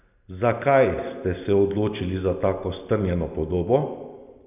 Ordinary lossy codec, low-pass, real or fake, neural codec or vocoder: none; 3.6 kHz; real; none